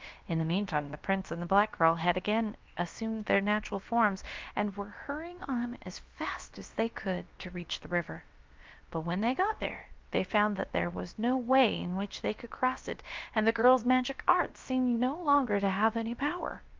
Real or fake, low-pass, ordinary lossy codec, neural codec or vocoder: fake; 7.2 kHz; Opus, 32 kbps; codec, 16 kHz, about 1 kbps, DyCAST, with the encoder's durations